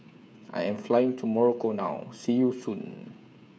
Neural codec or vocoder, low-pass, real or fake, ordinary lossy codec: codec, 16 kHz, 16 kbps, FreqCodec, smaller model; none; fake; none